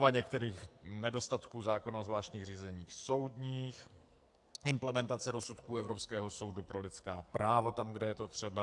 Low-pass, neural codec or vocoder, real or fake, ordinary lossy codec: 10.8 kHz; codec, 44.1 kHz, 2.6 kbps, SNAC; fake; AAC, 64 kbps